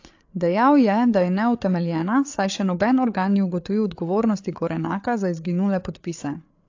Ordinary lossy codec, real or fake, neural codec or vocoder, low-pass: AAC, 48 kbps; fake; codec, 16 kHz, 8 kbps, FreqCodec, larger model; 7.2 kHz